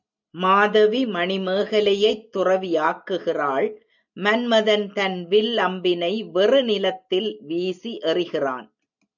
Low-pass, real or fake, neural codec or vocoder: 7.2 kHz; real; none